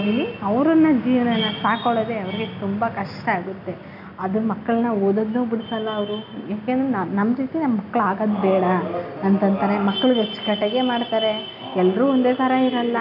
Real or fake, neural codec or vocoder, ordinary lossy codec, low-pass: real; none; none; 5.4 kHz